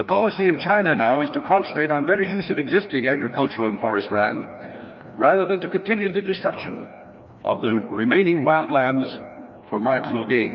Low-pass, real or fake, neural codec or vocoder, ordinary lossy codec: 7.2 kHz; fake; codec, 16 kHz, 1 kbps, FreqCodec, larger model; MP3, 48 kbps